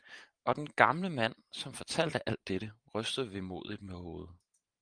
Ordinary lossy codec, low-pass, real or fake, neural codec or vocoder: Opus, 32 kbps; 9.9 kHz; fake; vocoder, 44.1 kHz, 128 mel bands every 512 samples, BigVGAN v2